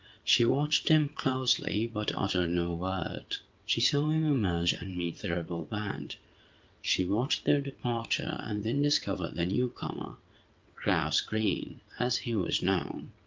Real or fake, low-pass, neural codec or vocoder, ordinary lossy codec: fake; 7.2 kHz; vocoder, 22.05 kHz, 80 mel bands, WaveNeXt; Opus, 32 kbps